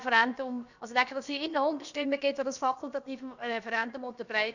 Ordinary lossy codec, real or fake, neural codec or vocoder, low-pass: none; fake; codec, 16 kHz, about 1 kbps, DyCAST, with the encoder's durations; 7.2 kHz